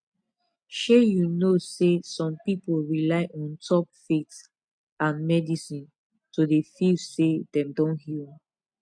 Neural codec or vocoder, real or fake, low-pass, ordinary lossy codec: none; real; 9.9 kHz; MP3, 64 kbps